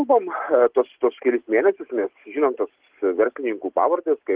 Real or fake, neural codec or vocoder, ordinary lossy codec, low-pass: real; none; Opus, 16 kbps; 3.6 kHz